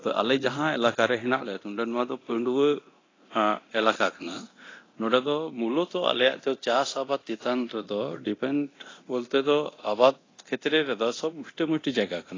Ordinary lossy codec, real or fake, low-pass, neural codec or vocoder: AAC, 32 kbps; fake; 7.2 kHz; codec, 24 kHz, 0.9 kbps, DualCodec